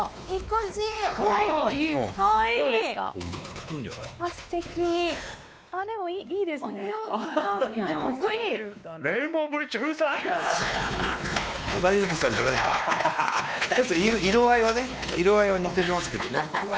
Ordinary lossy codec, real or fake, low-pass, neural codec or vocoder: none; fake; none; codec, 16 kHz, 2 kbps, X-Codec, WavLM features, trained on Multilingual LibriSpeech